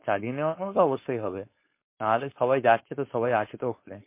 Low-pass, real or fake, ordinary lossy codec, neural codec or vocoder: 3.6 kHz; fake; MP3, 24 kbps; codec, 16 kHz, 4.8 kbps, FACodec